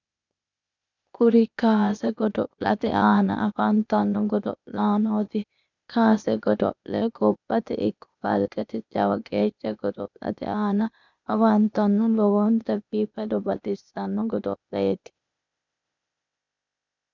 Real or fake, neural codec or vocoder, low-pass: fake; codec, 16 kHz, 0.8 kbps, ZipCodec; 7.2 kHz